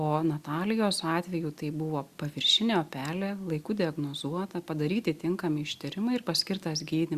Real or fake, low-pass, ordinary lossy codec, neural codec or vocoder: real; 14.4 kHz; Opus, 64 kbps; none